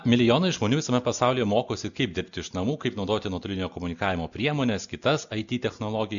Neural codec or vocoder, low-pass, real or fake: none; 7.2 kHz; real